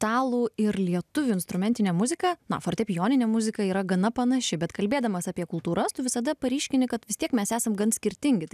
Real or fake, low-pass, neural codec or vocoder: real; 14.4 kHz; none